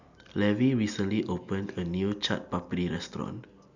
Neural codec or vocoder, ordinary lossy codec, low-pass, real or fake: none; none; 7.2 kHz; real